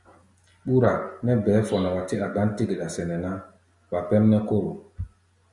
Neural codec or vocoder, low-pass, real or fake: none; 10.8 kHz; real